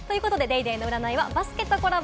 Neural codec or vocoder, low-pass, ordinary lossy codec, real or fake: none; none; none; real